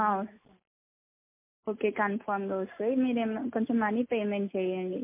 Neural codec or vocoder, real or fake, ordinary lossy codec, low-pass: none; real; MP3, 24 kbps; 3.6 kHz